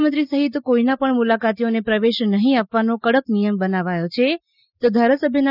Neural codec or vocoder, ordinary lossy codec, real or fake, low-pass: none; none; real; 5.4 kHz